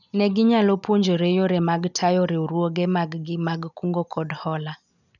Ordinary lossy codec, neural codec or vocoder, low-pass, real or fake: none; none; 7.2 kHz; real